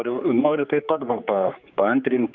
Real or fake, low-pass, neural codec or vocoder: fake; 7.2 kHz; codec, 16 kHz, 4 kbps, X-Codec, HuBERT features, trained on general audio